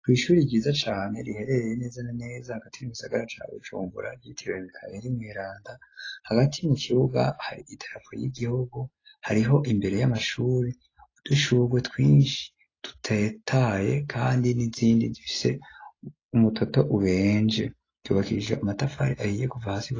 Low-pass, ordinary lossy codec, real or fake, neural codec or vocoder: 7.2 kHz; AAC, 32 kbps; real; none